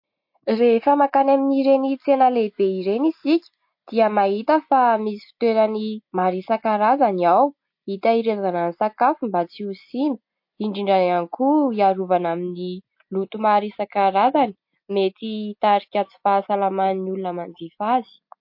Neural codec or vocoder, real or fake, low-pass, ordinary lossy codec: none; real; 5.4 kHz; MP3, 32 kbps